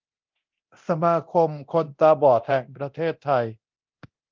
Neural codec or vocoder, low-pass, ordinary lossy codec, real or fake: codec, 24 kHz, 0.9 kbps, DualCodec; 7.2 kHz; Opus, 32 kbps; fake